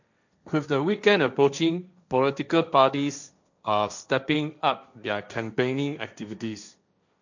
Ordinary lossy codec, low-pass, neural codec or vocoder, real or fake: none; 7.2 kHz; codec, 16 kHz, 1.1 kbps, Voila-Tokenizer; fake